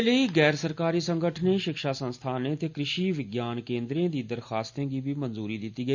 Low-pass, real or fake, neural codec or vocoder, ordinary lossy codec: 7.2 kHz; real; none; none